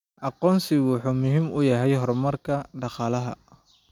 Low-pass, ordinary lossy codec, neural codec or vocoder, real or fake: 19.8 kHz; none; none; real